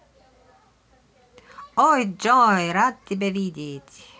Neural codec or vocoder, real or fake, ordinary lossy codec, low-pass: none; real; none; none